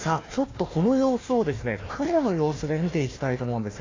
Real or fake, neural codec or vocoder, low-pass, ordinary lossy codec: fake; codec, 16 kHz, 1 kbps, FunCodec, trained on Chinese and English, 50 frames a second; 7.2 kHz; AAC, 32 kbps